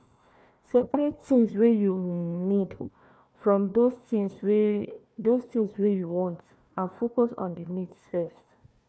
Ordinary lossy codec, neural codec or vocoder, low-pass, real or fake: none; codec, 16 kHz, 1 kbps, FunCodec, trained on Chinese and English, 50 frames a second; none; fake